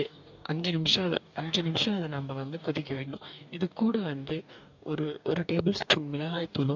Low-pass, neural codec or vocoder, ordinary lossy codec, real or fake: 7.2 kHz; codec, 44.1 kHz, 2.6 kbps, DAC; MP3, 64 kbps; fake